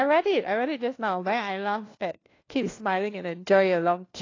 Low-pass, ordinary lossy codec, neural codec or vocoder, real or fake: 7.2 kHz; AAC, 32 kbps; codec, 16 kHz, 1 kbps, FunCodec, trained on LibriTTS, 50 frames a second; fake